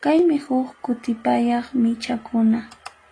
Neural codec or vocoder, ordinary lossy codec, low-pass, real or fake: none; AAC, 32 kbps; 9.9 kHz; real